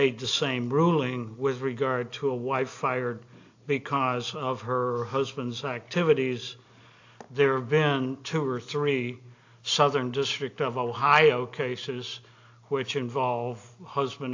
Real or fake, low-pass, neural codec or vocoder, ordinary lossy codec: real; 7.2 kHz; none; AAC, 48 kbps